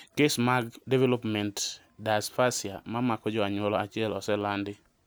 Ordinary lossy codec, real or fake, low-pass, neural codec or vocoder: none; real; none; none